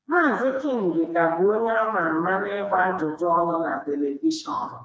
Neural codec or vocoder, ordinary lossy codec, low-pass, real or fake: codec, 16 kHz, 2 kbps, FreqCodec, smaller model; none; none; fake